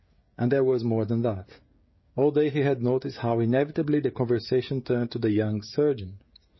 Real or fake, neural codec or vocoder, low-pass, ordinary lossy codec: fake; codec, 16 kHz, 16 kbps, FreqCodec, smaller model; 7.2 kHz; MP3, 24 kbps